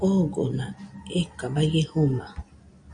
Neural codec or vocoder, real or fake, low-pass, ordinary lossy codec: none; real; 9.9 kHz; MP3, 96 kbps